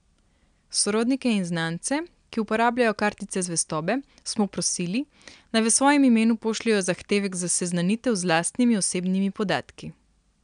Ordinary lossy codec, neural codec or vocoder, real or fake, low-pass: MP3, 96 kbps; none; real; 9.9 kHz